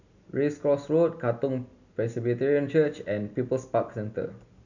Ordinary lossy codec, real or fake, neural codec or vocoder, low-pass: none; real; none; 7.2 kHz